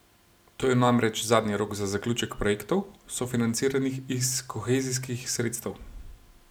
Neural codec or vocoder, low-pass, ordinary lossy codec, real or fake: none; none; none; real